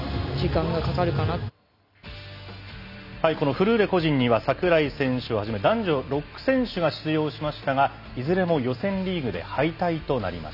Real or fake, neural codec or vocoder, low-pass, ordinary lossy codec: real; none; 5.4 kHz; none